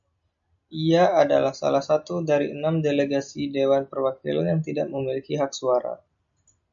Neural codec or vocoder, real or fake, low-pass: none; real; 7.2 kHz